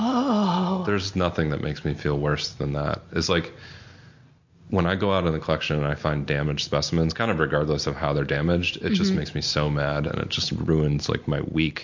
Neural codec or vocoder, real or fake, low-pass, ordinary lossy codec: none; real; 7.2 kHz; MP3, 48 kbps